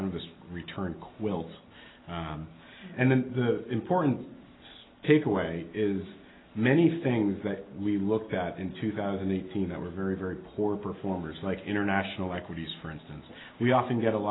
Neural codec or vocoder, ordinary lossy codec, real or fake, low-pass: none; AAC, 16 kbps; real; 7.2 kHz